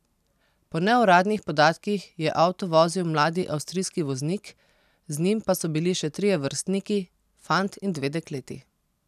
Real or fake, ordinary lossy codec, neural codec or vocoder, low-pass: real; none; none; 14.4 kHz